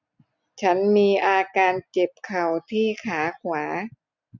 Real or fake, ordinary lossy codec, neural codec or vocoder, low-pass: real; none; none; 7.2 kHz